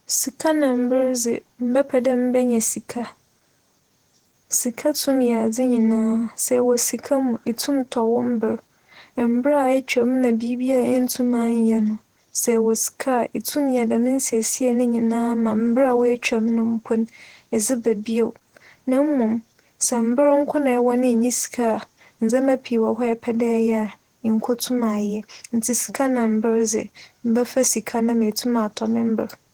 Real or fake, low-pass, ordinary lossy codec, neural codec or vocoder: fake; 19.8 kHz; Opus, 16 kbps; vocoder, 48 kHz, 128 mel bands, Vocos